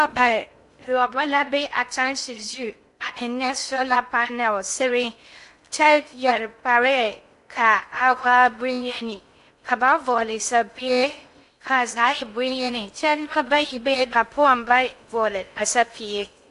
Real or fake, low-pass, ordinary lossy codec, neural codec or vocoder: fake; 10.8 kHz; AAC, 64 kbps; codec, 16 kHz in and 24 kHz out, 0.6 kbps, FocalCodec, streaming, 2048 codes